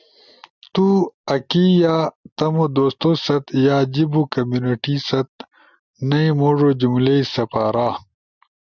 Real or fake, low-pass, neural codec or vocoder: real; 7.2 kHz; none